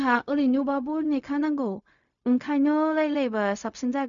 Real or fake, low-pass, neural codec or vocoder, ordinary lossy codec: fake; 7.2 kHz; codec, 16 kHz, 0.4 kbps, LongCat-Audio-Codec; none